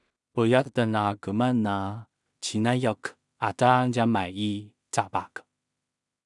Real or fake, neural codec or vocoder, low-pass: fake; codec, 16 kHz in and 24 kHz out, 0.4 kbps, LongCat-Audio-Codec, two codebook decoder; 10.8 kHz